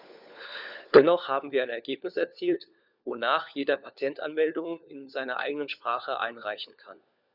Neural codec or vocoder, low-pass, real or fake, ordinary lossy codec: codec, 16 kHz, 4 kbps, FunCodec, trained on LibriTTS, 50 frames a second; 5.4 kHz; fake; none